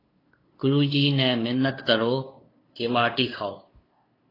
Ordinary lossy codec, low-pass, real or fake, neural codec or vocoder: AAC, 24 kbps; 5.4 kHz; fake; codec, 16 kHz, 2 kbps, FunCodec, trained on LibriTTS, 25 frames a second